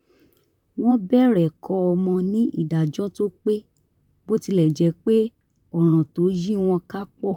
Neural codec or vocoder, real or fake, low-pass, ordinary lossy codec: vocoder, 44.1 kHz, 128 mel bands, Pupu-Vocoder; fake; 19.8 kHz; none